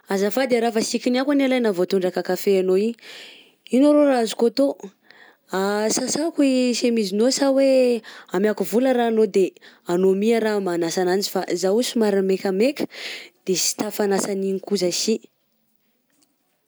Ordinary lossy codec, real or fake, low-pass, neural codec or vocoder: none; real; none; none